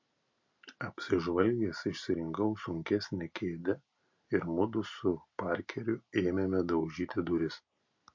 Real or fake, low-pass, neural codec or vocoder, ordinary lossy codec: real; 7.2 kHz; none; MP3, 48 kbps